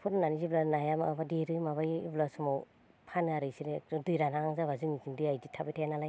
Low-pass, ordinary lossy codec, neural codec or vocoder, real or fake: none; none; none; real